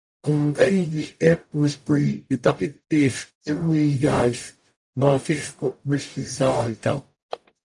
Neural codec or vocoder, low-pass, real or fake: codec, 44.1 kHz, 0.9 kbps, DAC; 10.8 kHz; fake